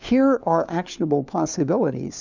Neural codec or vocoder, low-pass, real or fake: codec, 16 kHz, 4 kbps, FunCodec, trained on LibriTTS, 50 frames a second; 7.2 kHz; fake